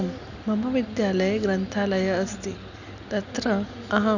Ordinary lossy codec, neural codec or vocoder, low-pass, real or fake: none; none; 7.2 kHz; real